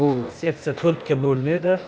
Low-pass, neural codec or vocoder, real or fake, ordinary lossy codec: none; codec, 16 kHz, 0.8 kbps, ZipCodec; fake; none